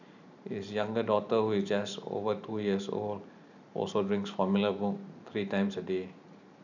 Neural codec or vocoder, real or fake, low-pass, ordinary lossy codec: vocoder, 44.1 kHz, 128 mel bands every 512 samples, BigVGAN v2; fake; 7.2 kHz; none